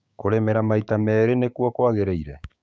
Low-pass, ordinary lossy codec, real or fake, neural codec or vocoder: none; none; fake; codec, 16 kHz, 6 kbps, DAC